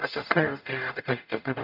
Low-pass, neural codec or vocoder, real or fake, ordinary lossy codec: 5.4 kHz; codec, 44.1 kHz, 0.9 kbps, DAC; fake; none